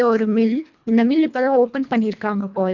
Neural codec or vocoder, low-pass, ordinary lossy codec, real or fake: codec, 24 kHz, 1.5 kbps, HILCodec; 7.2 kHz; none; fake